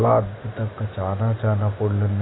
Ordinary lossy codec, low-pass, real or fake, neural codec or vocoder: AAC, 16 kbps; 7.2 kHz; real; none